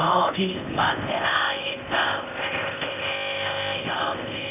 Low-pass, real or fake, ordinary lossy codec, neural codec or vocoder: 3.6 kHz; fake; none; codec, 16 kHz in and 24 kHz out, 0.6 kbps, FocalCodec, streaming, 4096 codes